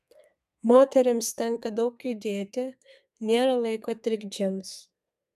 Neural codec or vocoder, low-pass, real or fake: codec, 32 kHz, 1.9 kbps, SNAC; 14.4 kHz; fake